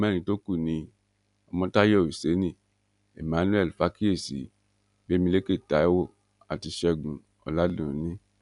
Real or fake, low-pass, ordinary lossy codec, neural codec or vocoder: real; 10.8 kHz; none; none